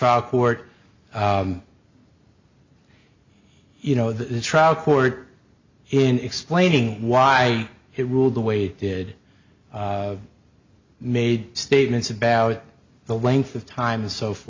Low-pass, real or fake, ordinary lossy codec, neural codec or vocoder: 7.2 kHz; real; MP3, 64 kbps; none